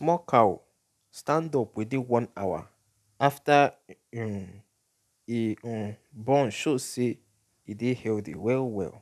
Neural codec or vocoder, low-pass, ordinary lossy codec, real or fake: none; 14.4 kHz; none; real